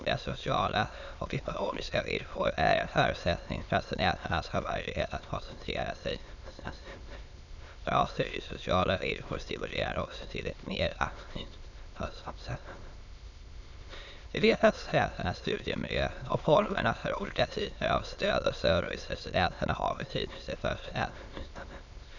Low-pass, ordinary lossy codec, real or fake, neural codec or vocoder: 7.2 kHz; none; fake; autoencoder, 22.05 kHz, a latent of 192 numbers a frame, VITS, trained on many speakers